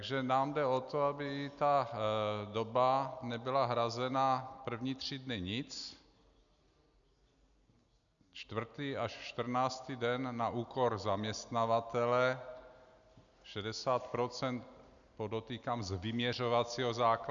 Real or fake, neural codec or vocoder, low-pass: real; none; 7.2 kHz